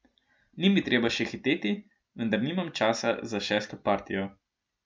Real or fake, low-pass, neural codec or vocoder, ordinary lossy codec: real; none; none; none